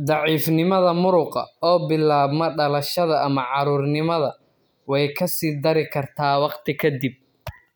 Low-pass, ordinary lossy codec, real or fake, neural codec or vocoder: none; none; real; none